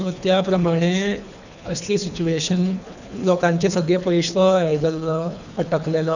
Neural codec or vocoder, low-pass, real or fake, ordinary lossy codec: codec, 24 kHz, 3 kbps, HILCodec; 7.2 kHz; fake; none